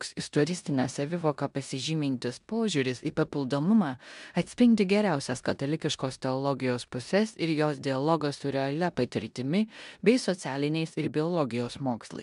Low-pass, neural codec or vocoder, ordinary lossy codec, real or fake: 10.8 kHz; codec, 16 kHz in and 24 kHz out, 0.9 kbps, LongCat-Audio-Codec, four codebook decoder; MP3, 96 kbps; fake